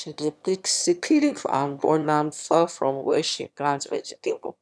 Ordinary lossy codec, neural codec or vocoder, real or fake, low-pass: none; autoencoder, 22.05 kHz, a latent of 192 numbers a frame, VITS, trained on one speaker; fake; none